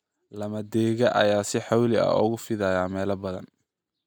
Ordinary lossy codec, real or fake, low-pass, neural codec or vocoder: none; real; none; none